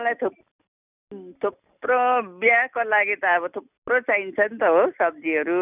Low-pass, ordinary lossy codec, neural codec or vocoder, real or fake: 3.6 kHz; none; none; real